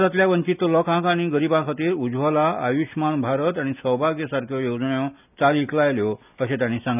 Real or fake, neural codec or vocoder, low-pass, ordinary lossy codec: real; none; 3.6 kHz; none